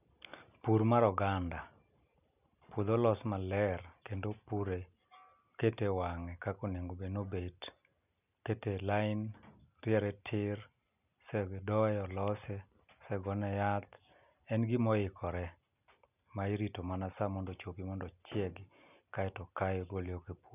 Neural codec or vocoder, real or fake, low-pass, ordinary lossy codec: none; real; 3.6 kHz; none